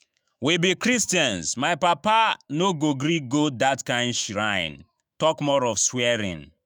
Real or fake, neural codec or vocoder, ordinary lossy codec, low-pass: fake; autoencoder, 48 kHz, 128 numbers a frame, DAC-VAE, trained on Japanese speech; none; none